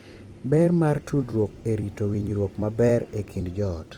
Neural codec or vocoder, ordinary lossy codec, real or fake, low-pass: vocoder, 44.1 kHz, 128 mel bands every 256 samples, BigVGAN v2; Opus, 24 kbps; fake; 19.8 kHz